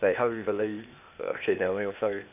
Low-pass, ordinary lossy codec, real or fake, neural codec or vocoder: 3.6 kHz; none; fake; codec, 16 kHz, 0.8 kbps, ZipCodec